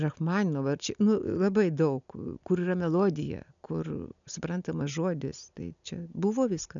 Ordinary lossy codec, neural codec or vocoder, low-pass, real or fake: MP3, 96 kbps; none; 7.2 kHz; real